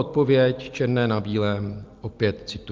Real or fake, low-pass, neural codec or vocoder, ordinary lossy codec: real; 7.2 kHz; none; Opus, 32 kbps